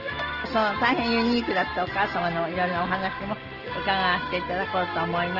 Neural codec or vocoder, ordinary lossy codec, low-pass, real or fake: none; Opus, 16 kbps; 5.4 kHz; real